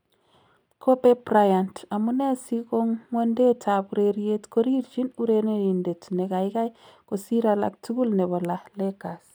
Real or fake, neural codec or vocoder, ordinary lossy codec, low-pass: real; none; none; none